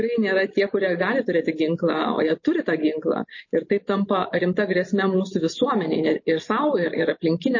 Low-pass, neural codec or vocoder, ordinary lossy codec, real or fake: 7.2 kHz; none; MP3, 32 kbps; real